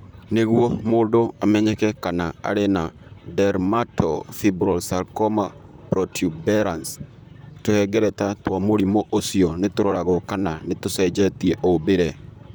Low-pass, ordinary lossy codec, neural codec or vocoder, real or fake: none; none; vocoder, 44.1 kHz, 128 mel bands, Pupu-Vocoder; fake